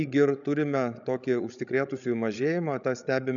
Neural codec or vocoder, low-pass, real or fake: codec, 16 kHz, 16 kbps, FreqCodec, larger model; 7.2 kHz; fake